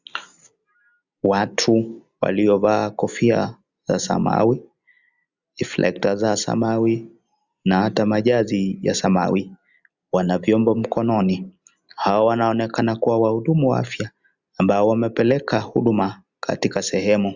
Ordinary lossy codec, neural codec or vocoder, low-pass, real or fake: Opus, 64 kbps; none; 7.2 kHz; real